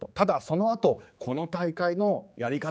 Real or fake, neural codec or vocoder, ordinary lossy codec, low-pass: fake; codec, 16 kHz, 4 kbps, X-Codec, HuBERT features, trained on general audio; none; none